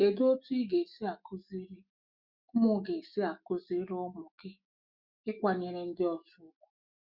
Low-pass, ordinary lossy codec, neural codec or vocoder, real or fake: 5.4 kHz; Opus, 64 kbps; autoencoder, 48 kHz, 128 numbers a frame, DAC-VAE, trained on Japanese speech; fake